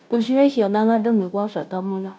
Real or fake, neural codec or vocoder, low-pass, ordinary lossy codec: fake; codec, 16 kHz, 0.5 kbps, FunCodec, trained on Chinese and English, 25 frames a second; none; none